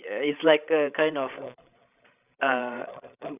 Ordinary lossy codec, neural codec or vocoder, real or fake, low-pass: none; codec, 16 kHz, 16 kbps, FreqCodec, larger model; fake; 3.6 kHz